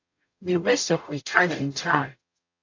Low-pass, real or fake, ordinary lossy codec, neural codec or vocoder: 7.2 kHz; fake; AAC, 48 kbps; codec, 44.1 kHz, 0.9 kbps, DAC